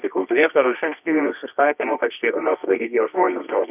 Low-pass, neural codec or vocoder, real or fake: 3.6 kHz; codec, 24 kHz, 0.9 kbps, WavTokenizer, medium music audio release; fake